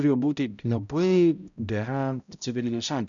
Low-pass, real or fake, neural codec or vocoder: 7.2 kHz; fake; codec, 16 kHz, 0.5 kbps, X-Codec, HuBERT features, trained on balanced general audio